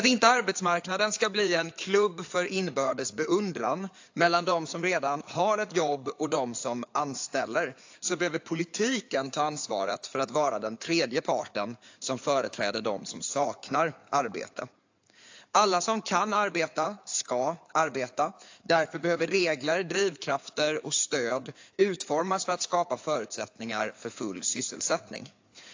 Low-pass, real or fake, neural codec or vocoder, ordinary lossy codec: 7.2 kHz; fake; codec, 16 kHz in and 24 kHz out, 2.2 kbps, FireRedTTS-2 codec; AAC, 48 kbps